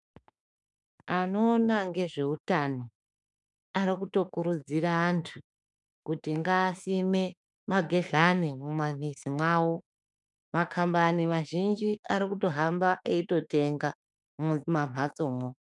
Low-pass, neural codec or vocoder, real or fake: 10.8 kHz; autoencoder, 48 kHz, 32 numbers a frame, DAC-VAE, trained on Japanese speech; fake